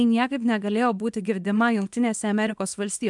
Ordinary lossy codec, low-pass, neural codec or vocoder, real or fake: MP3, 96 kbps; 10.8 kHz; codec, 24 kHz, 0.9 kbps, WavTokenizer, small release; fake